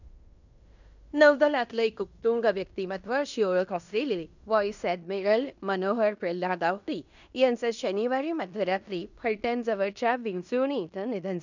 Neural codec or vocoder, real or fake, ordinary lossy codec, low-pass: codec, 16 kHz in and 24 kHz out, 0.9 kbps, LongCat-Audio-Codec, fine tuned four codebook decoder; fake; none; 7.2 kHz